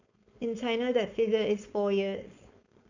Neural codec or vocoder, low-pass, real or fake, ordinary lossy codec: codec, 16 kHz, 4.8 kbps, FACodec; 7.2 kHz; fake; none